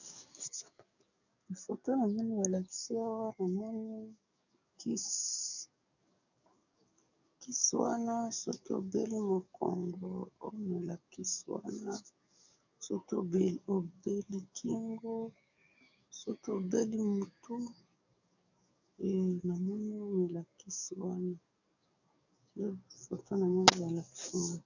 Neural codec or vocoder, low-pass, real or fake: codec, 44.1 kHz, 7.8 kbps, DAC; 7.2 kHz; fake